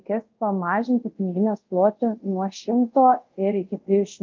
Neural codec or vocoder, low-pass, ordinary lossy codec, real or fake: codec, 24 kHz, 0.5 kbps, DualCodec; 7.2 kHz; Opus, 24 kbps; fake